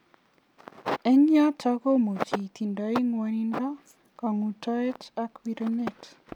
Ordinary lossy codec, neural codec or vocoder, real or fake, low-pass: none; none; real; 19.8 kHz